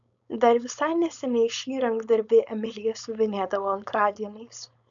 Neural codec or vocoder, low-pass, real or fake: codec, 16 kHz, 4.8 kbps, FACodec; 7.2 kHz; fake